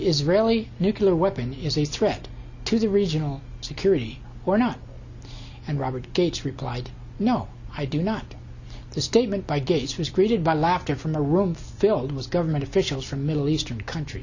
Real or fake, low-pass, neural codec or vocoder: real; 7.2 kHz; none